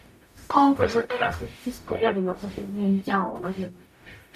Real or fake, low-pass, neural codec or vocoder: fake; 14.4 kHz; codec, 44.1 kHz, 0.9 kbps, DAC